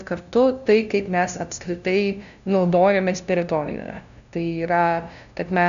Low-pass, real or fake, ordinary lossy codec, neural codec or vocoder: 7.2 kHz; fake; MP3, 96 kbps; codec, 16 kHz, 0.5 kbps, FunCodec, trained on LibriTTS, 25 frames a second